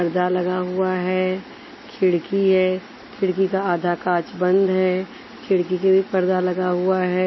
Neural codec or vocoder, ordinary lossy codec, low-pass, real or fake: none; MP3, 24 kbps; 7.2 kHz; real